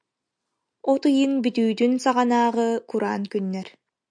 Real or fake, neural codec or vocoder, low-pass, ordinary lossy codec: real; none; 9.9 kHz; MP3, 96 kbps